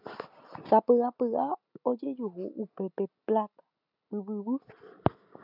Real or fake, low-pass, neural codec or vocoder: real; 5.4 kHz; none